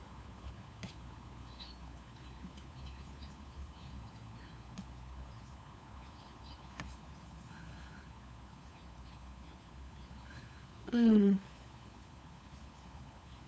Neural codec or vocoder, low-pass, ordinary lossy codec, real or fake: codec, 16 kHz, 4 kbps, FunCodec, trained on LibriTTS, 50 frames a second; none; none; fake